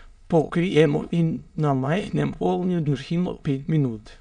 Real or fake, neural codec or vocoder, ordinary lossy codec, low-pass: fake; autoencoder, 22.05 kHz, a latent of 192 numbers a frame, VITS, trained on many speakers; none; 9.9 kHz